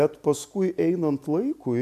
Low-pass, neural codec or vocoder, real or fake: 14.4 kHz; none; real